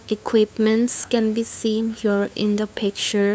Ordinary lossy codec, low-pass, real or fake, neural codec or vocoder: none; none; fake; codec, 16 kHz, 2 kbps, FunCodec, trained on LibriTTS, 25 frames a second